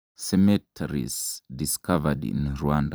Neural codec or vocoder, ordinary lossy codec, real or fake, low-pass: vocoder, 44.1 kHz, 128 mel bands every 256 samples, BigVGAN v2; none; fake; none